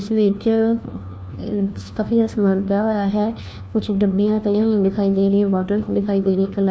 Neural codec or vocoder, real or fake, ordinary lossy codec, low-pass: codec, 16 kHz, 1 kbps, FunCodec, trained on LibriTTS, 50 frames a second; fake; none; none